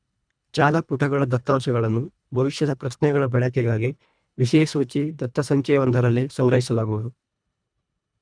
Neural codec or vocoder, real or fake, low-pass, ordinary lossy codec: codec, 24 kHz, 1.5 kbps, HILCodec; fake; 9.9 kHz; none